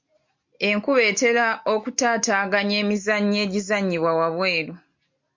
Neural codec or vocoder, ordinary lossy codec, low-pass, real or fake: none; MP3, 48 kbps; 7.2 kHz; real